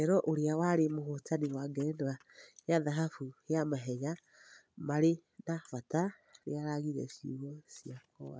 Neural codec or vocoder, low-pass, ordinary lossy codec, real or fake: none; none; none; real